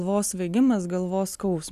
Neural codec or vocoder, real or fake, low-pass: none; real; 14.4 kHz